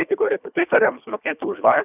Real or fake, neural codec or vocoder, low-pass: fake; codec, 24 kHz, 1.5 kbps, HILCodec; 3.6 kHz